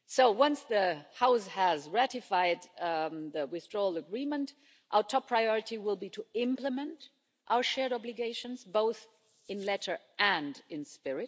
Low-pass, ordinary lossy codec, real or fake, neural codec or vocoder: none; none; real; none